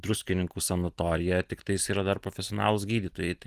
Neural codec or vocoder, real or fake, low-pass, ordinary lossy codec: none; real; 14.4 kHz; Opus, 24 kbps